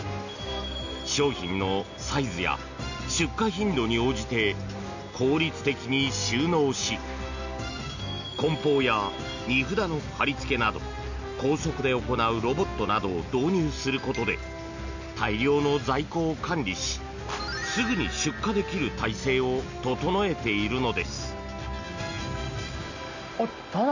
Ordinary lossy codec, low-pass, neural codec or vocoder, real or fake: none; 7.2 kHz; none; real